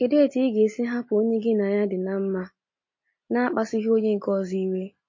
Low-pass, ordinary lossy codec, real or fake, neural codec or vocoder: 7.2 kHz; MP3, 32 kbps; real; none